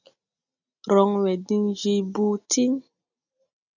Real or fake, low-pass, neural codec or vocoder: real; 7.2 kHz; none